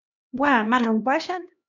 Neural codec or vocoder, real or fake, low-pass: codec, 16 kHz, 1 kbps, X-Codec, HuBERT features, trained on LibriSpeech; fake; 7.2 kHz